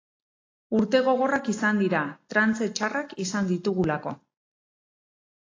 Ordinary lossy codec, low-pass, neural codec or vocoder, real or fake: AAC, 32 kbps; 7.2 kHz; none; real